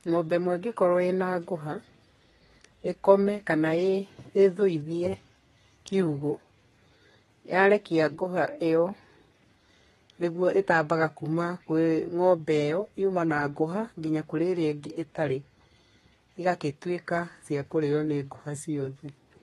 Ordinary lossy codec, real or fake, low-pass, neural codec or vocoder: AAC, 32 kbps; fake; 14.4 kHz; codec, 32 kHz, 1.9 kbps, SNAC